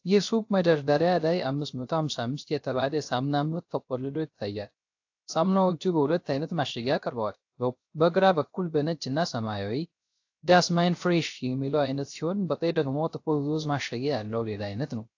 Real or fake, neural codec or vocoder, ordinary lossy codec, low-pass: fake; codec, 16 kHz, 0.3 kbps, FocalCodec; AAC, 48 kbps; 7.2 kHz